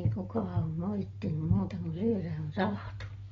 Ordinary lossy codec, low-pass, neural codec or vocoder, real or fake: AAC, 24 kbps; 7.2 kHz; none; real